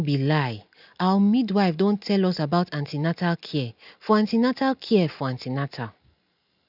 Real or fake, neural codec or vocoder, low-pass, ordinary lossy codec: real; none; 5.4 kHz; none